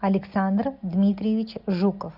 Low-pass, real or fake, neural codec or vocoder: 5.4 kHz; real; none